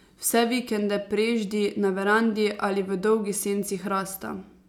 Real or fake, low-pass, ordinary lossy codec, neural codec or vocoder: real; 19.8 kHz; none; none